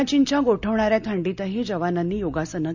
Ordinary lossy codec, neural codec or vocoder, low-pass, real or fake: none; none; 7.2 kHz; real